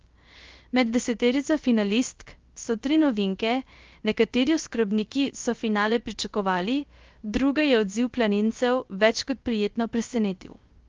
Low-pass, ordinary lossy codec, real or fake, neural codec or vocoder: 7.2 kHz; Opus, 32 kbps; fake; codec, 16 kHz, 0.3 kbps, FocalCodec